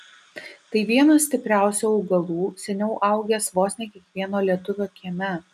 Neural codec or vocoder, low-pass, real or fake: none; 14.4 kHz; real